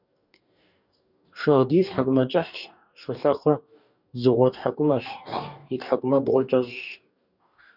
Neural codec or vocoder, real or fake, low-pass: codec, 44.1 kHz, 2.6 kbps, DAC; fake; 5.4 kHz